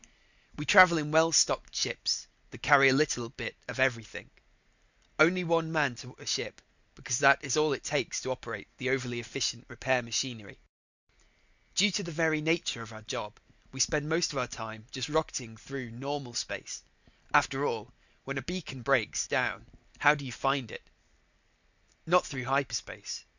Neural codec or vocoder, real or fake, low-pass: none; real; 7.2 kHz